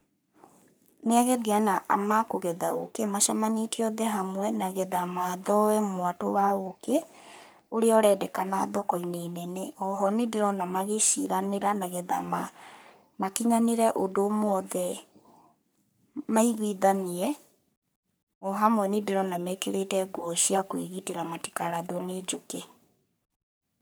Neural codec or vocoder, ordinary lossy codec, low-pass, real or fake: codec, 44.1 kHz, 3.4 kbps, Pupu-Codec; none; none; fake